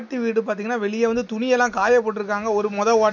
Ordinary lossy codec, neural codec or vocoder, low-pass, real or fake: none; none; 7.2 kHz; real